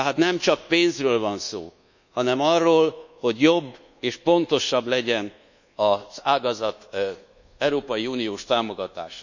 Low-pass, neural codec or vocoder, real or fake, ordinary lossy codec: 7.2 kHz; codec, 24 kHz, 1.2 kbps, DualCodec; fake; none